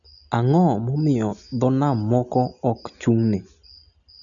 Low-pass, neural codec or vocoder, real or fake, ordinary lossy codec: 7.2 kHz; none; real; none